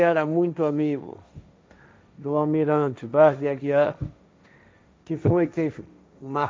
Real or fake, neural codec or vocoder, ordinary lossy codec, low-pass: fake; codec, 16 kHz, 1.1 kbps, Voila-Tokenizer; none; none